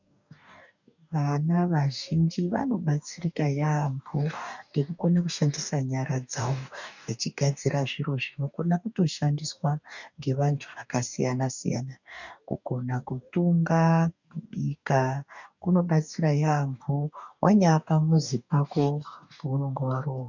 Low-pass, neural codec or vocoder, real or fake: 7.2 kHz; codec, 44.1 kHz, 2.6 kbps, DAC; fake